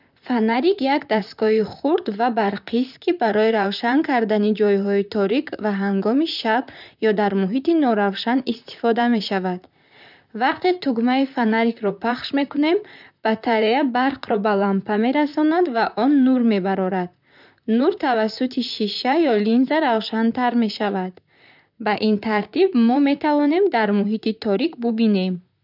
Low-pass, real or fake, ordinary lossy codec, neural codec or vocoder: 5.4 kHz; fake; none; vocoder, 44.1 kHz, 128 mel bands, Pupu-Vocoder